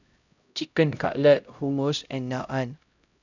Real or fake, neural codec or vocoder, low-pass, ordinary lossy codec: fake; codec, 16 kHz, 0.5 kbps, X-Codec, HuBERT features, trained on LibriSpeech; 7.2 kHz; none